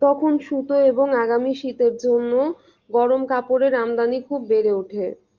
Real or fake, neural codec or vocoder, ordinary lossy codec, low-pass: real; none; Opus, 32 kbps; 7.2 kHz